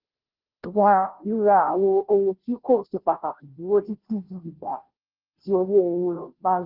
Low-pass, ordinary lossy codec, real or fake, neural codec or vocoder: 5.4 kHz; Opus, 16 kbps; fake; codec, 16 kHz, 0.5 kbps, FunCodec, trained on Chinese and English, 25 frames a second